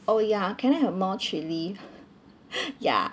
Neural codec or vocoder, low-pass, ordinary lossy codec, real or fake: none; none; none; real